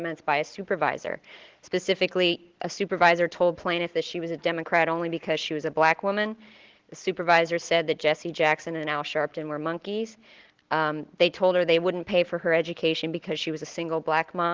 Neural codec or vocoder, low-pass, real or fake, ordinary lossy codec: none; 7.2 kHz; real; Opus, 16 kbps